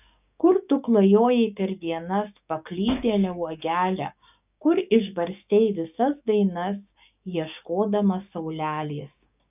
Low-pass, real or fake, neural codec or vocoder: 3.6 kHz; fake; codec, 16 kHz, 6 kbps, DAC